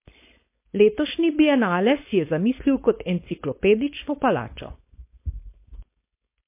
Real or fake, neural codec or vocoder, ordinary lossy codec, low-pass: fake; codec, 16 kHz, 4.8 kbps, FACodec; MP3, 24 kbps; 3.6 kHz